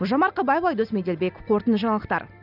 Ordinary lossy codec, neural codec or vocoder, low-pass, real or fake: none; none; 5.4 kHz; real